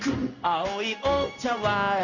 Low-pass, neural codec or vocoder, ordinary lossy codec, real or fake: 7.2 kHz; codec, 16 kHz in and 24 kHz out, 1 kbps, XY-Tokenizer; none; fake